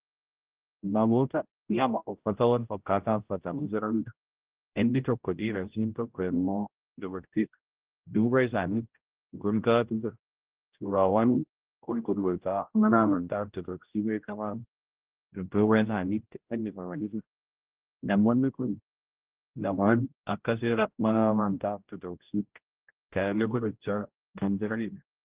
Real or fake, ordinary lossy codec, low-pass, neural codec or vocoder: fake; Opus, 16 kbps; 3.6 kHz; codec, 16 kHz, 0.5 kbps, X-Codec, HuBERT features, trained on general audio